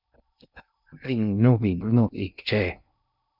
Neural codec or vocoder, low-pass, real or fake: codec, 16 kHz in and 24 kHz out, 0.6 kbps, FocalCodec, streaming, 2048 codes; 5.4 kHz; fake